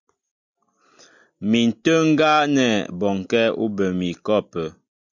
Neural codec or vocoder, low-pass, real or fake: none; 7.2 kHz; real